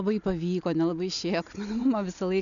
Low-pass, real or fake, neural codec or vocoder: 7.2 kHz; real; none